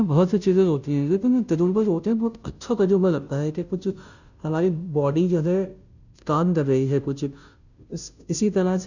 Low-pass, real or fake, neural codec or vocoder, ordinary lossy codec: 7.2 kHz; fake; codec, 16 kHz, 0.5 kbps, FunCodec, trained on Chinese and English, 25 frames a second; none